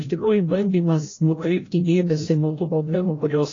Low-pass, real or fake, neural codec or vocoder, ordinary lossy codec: 7.2 kHz; fake; codec, 16 kHz, 0.5 kbps, FreqCodec, larger model; AAC, 32 kbps